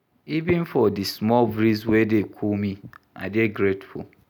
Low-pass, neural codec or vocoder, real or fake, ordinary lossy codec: 19.8 kHz; none; real; none